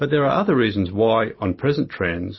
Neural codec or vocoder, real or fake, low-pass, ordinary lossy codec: none; real; 7.2 kHz; MP3, 24 kbps